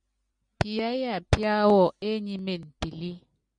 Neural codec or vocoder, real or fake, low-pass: none; real; 9.9 kHz